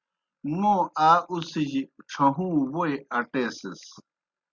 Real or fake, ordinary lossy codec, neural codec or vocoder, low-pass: real; Opus, 64 kbps; none; 7.2 kHz